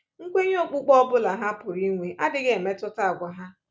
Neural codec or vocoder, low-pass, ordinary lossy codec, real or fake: none; none; none; real